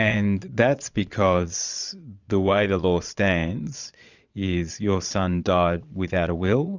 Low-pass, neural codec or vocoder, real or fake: 7.2 kHz; vocoder, 22.05 kHz, 80 mel bands, Vocos; fake